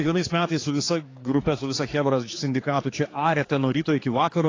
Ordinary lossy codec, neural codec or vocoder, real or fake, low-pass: AAC, 32 kbps; codec, 16 kHz, 4 kbps, X-Codec, HuBERT features, trained on general audio; fake; 7.2 kHz